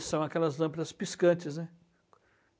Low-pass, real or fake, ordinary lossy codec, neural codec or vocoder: none; real; none; none